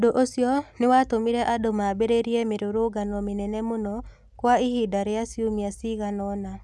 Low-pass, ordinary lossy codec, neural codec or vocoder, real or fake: none; none; none; real